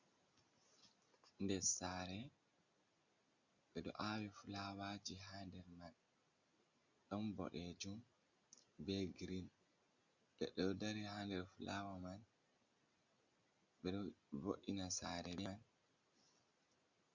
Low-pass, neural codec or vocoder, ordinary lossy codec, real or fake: 7.2 kHz; none; Opus, 64 kbps; real